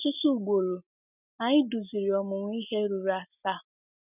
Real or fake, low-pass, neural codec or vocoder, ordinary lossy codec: real; 3.6 kHz; none; none